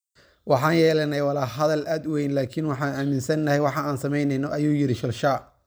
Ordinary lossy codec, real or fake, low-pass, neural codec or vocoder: none; real; none; none